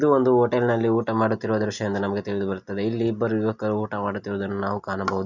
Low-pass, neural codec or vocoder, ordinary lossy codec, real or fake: 7.2 kHz; none; none; real